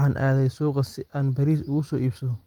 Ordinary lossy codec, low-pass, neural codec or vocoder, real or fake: Opus, 24 kbps; 19.8 kHz; none; real